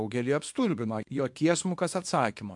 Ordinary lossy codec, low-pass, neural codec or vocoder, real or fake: MP3, 64 kbps; 10.8 kHz; codec, 24 kHz, 0.9 kbps, WavTokenizer, small release; fake